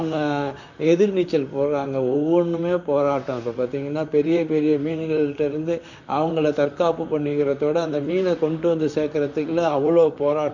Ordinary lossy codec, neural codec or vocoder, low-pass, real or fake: none; vocoder, 44.1 kHz, 128 mel bands, Pupu-Vocoder; 7.2 kHz; fake